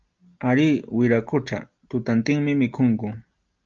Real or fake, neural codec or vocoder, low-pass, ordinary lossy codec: real; none; 7.2 kHz; Opus, 24 kbps